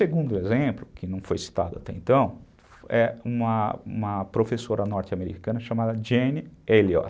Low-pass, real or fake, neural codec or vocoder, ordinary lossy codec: none; real; none; none